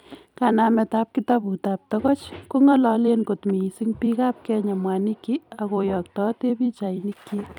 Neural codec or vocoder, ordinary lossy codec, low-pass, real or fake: vocoder, 44.1 kHz, 128 mel bands every 256 samples, BigVGAN v2; none; 19.8 kHz; fake